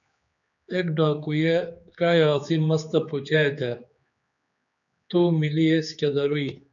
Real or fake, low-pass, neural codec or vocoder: fake; 7.2 kHz; codec, 16 kHz, 4 kbps, X-Codec, HuBERT features, trained on general audio